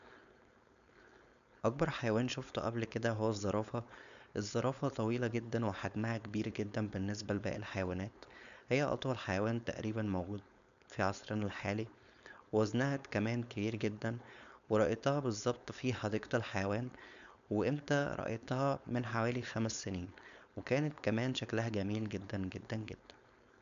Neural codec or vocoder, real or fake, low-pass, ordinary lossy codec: codec, 16 kHz, 4.8 kbps, FACodec; fake; 7.2 kHz; none